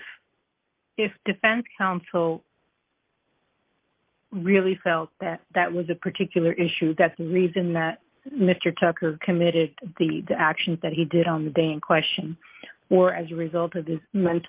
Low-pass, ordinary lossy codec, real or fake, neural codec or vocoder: 3.6 kHz; Opus, 24 kbps; real; none